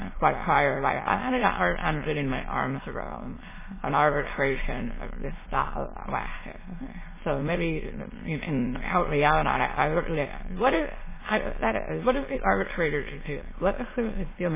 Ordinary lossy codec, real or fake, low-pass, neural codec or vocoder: MP3, 16 kbps; fake; 3.6 kHz; autoencoder, 22.05 kHz, a latent of 192 numbers a frame, VITS, trained on many speakers